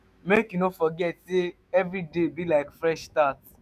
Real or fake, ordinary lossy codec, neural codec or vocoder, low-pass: fake; none; autoencoder, 48 kHz, 128 numbers a frame, DAC-VAE, trained on Japanese speech; 14.4 kHz